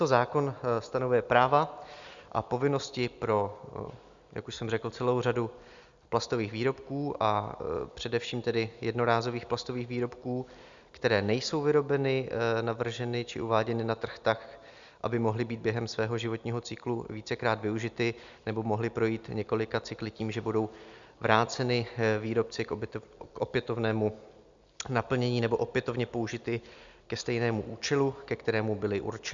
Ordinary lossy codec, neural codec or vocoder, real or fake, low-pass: Opus, 64 kbps; none; real; 7.2 kHz